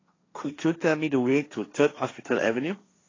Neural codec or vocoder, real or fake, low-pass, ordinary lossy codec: codec, 16 kHz, 1.1 kbps, Voila-Tokenizer; fake; 7.2 kHz; AAC, 32 kbps